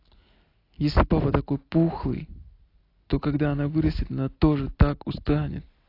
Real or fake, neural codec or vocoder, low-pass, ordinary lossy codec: real; none; 5.4 kHz; AAC, 32 kbps